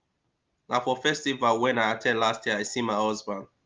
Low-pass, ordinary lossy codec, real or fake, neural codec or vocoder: 7.2 kHz; Opus, 32 kbps; real; none